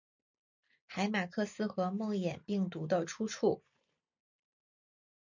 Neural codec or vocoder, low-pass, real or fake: none; 7.2 kHz; real